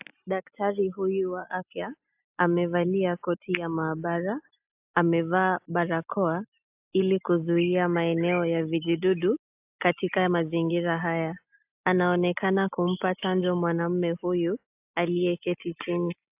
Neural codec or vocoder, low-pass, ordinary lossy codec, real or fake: none; 3.6 kHz; AAC, 32 kbps; real